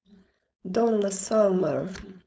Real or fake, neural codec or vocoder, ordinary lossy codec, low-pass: fake; codec, 16 kHz, 4.8 kbps, FACodec; none; none